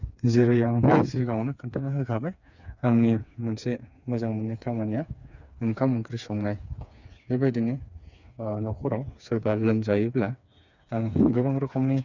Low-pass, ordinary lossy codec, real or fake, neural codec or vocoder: 7.2 kHz; none; fake; codec, 16 kHz, 4 kbps, FreqCodec, smaller model